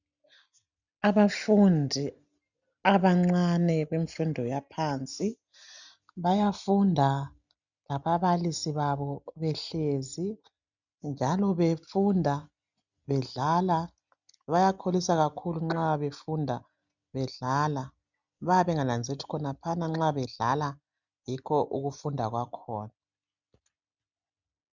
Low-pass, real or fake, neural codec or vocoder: 7.2 kHz; real; none